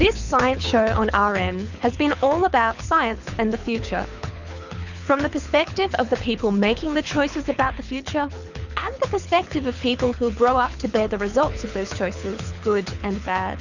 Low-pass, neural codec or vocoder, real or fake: 7.2 kHz; codec, 24 kHz, 6 kbps, HILCodec; fake